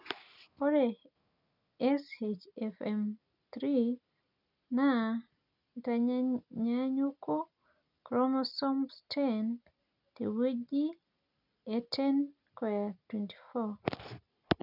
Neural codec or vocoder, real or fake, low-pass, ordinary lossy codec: none; real; 5.4 kHz; none